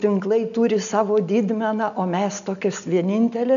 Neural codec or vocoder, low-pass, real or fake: none; 7.2 kHz; real